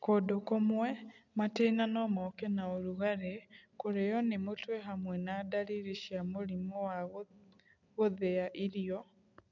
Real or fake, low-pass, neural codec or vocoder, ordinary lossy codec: real; 7.2 kHz; none; none